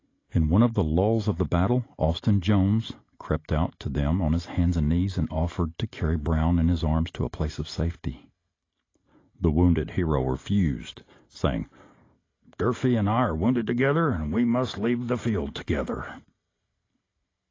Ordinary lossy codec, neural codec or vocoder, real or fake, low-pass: AAC, 32 kbps; none; real; 7.2 kHz